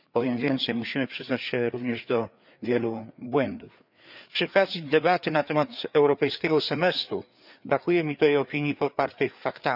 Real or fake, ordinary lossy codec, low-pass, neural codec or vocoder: fake; none; 5.4 kHz; codec, 16 kHz, 4 kbps, FreqCodec, larger model